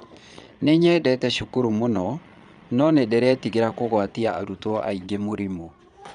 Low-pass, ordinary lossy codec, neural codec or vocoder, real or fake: 9.9 kHz; MP3, 96 kbps; vocoder, 22.05 kHz, 80 mel bands, Vocos; fake